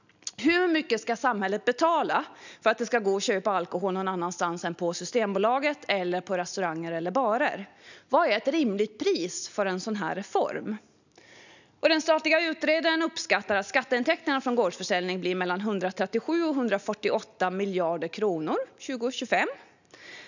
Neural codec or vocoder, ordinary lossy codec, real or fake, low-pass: none; none; real; 7.2 kHz